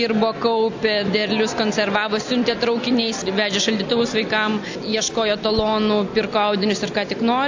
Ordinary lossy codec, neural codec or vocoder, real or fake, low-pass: AAC, 48 kbps; none; real; 7.2 kHz